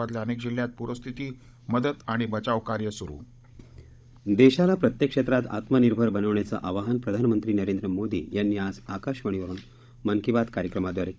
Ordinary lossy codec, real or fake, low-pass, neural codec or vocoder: none; fake; none; codec, 16 kHz, 16 kbps, FunCodec, trained on Chinese and English, 50 frames a second